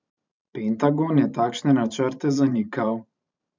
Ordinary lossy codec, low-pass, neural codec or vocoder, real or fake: MP3, 64 kbps; 7.2 kHz; vocoder, 44.1 kHz, 128 mel bands every 512 samples, BigVGAN v2; fake